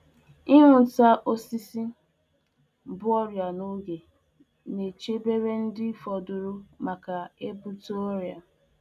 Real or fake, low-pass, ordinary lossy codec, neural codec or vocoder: real; 14.4 kHz; none; none